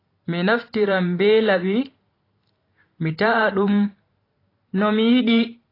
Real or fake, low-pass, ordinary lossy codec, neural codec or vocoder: fake; 5.4 kHz; AAC, 24 kbps; vocoder, 44.1 kHz, 128 mel bands every 512 samples, BigVGAN v2